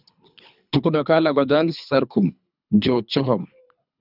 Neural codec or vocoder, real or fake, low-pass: codec, 24 kHz, 3 kbps, HILCodec; fake; 5.4 kHz